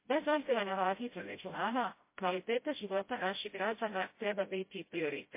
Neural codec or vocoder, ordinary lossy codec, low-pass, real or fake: codec, 16 kHz, 0.5 kbps, FreqCodec, smaller model; MP3, 24 kbps; 3.6 kHz; fake